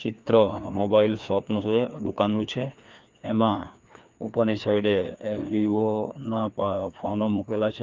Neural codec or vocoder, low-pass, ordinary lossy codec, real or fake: codec, 16 kHz, 2 kbps, FreqCodec, larger model; 7.2 kHz; Opus, 24 kbps; fake